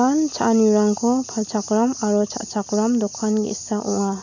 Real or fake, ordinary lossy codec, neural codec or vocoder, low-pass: real; none; none; 7.2 kHz